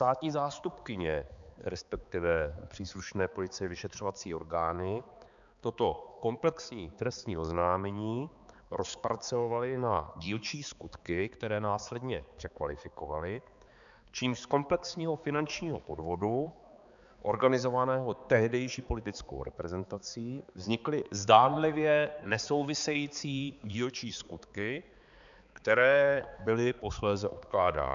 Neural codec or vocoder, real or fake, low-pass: codec, 16 kHz, 4 kbps, X-Codec, HuBERT features, trained on balanced general audio; fake; 7.2 kHz